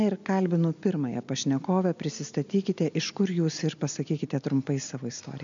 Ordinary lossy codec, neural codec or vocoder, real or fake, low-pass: MP3, 64 kbps; none; real; 7.2 kHz